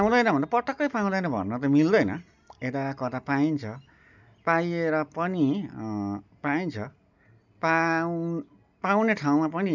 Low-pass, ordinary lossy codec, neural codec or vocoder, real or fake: 7.2 kHz; none; none; real